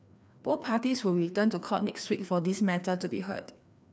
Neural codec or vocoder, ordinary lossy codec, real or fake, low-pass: codec, 16 kHz, 2 kbps, FreqCodec, larger model; none; fake; none